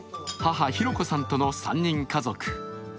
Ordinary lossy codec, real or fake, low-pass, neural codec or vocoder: none; real; none; none